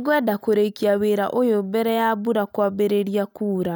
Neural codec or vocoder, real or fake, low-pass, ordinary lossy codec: none; real; none; none